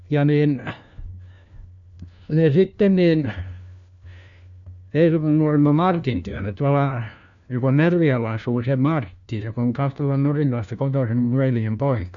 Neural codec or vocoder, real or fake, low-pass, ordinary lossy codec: codec, 16 kHz, 1 kbps, FunCodec, trained on LibriTTS, 50 frames a second; fake; 7.2 kHz; none